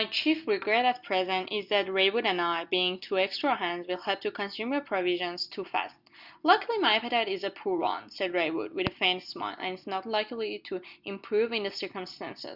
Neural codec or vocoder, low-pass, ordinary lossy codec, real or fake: none; 5.4 kHz; Opus, 64 kbps; real